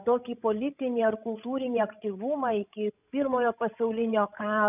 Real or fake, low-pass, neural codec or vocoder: fake; 3.6 kHz; codec, 16 kHz, 16 kbps, FreqCodec, larger model